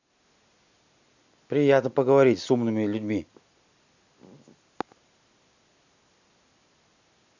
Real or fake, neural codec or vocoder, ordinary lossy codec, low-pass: real; none; none; 7.2 kHz